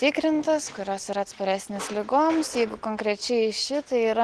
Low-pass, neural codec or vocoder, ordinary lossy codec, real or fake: 10.8 kHz; none; Opus, 16 kbps; real